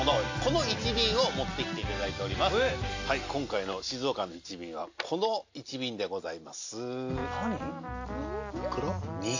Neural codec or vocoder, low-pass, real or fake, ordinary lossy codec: none; 7.2 kHz; real; AAC, 48 kbps